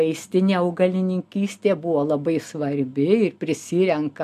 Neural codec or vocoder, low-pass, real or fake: none; 14.4 kHz; real